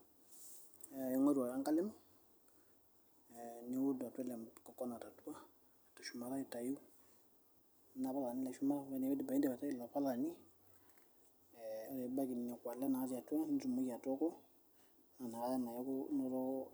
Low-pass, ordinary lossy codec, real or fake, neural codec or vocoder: none; none; real; none